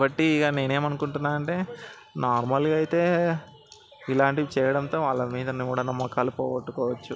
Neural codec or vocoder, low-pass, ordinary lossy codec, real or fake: none; none; none; real